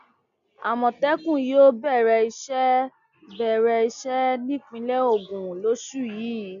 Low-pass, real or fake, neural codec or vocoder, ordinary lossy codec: 7.2 kHz; real; none; none